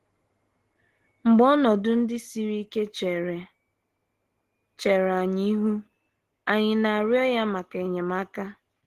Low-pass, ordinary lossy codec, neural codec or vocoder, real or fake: 14.4 kHz; Opus, 16 kbps; none; real